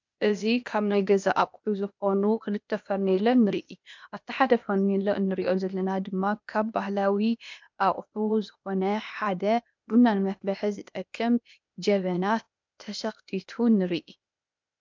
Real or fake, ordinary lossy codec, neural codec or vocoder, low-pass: fake; MP3, 64 kbps; codec, 16 kHz, 0.8 kbps, ZipCodec; 7.2 kHz